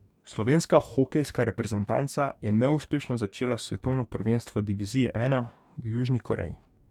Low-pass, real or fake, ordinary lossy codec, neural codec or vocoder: 19.8 kHz; fake; none; codec, 44.1 kHz, 2.6 kbps, DAC